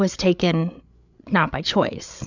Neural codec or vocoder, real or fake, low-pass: codec, 16 kHz, 8 kbps, FreqCodec, larger model; fake; 7.2 kHz